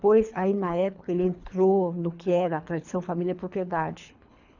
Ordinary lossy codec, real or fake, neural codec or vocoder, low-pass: none; fake; codec, 24 kHz, 3 kbps, HILCodec; 7.2 kHz